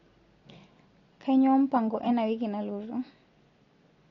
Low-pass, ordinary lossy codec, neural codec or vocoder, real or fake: 7.2 kHz; AAC, 32 kbps; none; real